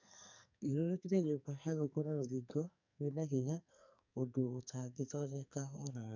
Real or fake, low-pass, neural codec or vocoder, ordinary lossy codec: fake; 7.2 kHz; codec, 44.1 kHz, 2.6 kbps, SNAC; none